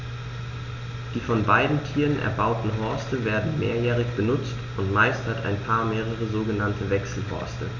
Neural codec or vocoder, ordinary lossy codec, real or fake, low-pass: none; none; real; 7.2 kHz